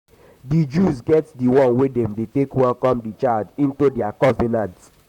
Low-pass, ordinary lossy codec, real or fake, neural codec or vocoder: 19.8 kHz; none; fake; vocoder, 44.1 kHz, 128 mel bands, Pupu-Vocoder